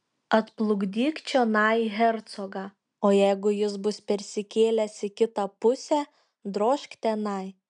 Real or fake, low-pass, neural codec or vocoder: real; 9.9 kHz; none